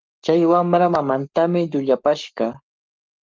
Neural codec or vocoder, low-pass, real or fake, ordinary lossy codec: codec, 44.1 kHz, 7.8 kbps, Pupu-Codec; 7.2 kHz; fake; Opus, 24 kbps